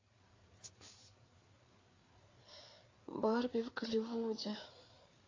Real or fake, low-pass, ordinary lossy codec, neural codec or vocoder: fake; 7.2 kHz; AAC, 32 kbps; codec, 16 kHz, 4 kbps, FreqCodec, larger model